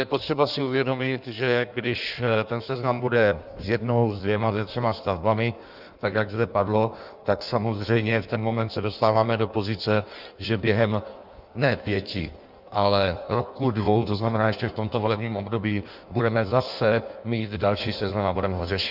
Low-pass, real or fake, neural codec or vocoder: 5.4 kHz; fake; codec, 16 kHz in and 24 kHz out, 1.1 kbps, FireRedTTS-2 codec